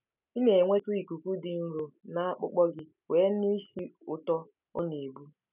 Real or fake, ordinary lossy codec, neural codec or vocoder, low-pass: real; none; none; 3.6 kHz